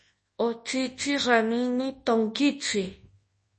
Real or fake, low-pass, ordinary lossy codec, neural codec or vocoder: fake; 10.8 kHz; MP3, 32 kbps; codec, 24 kHz, 0.9 kbps, WavTokenizer, large speech release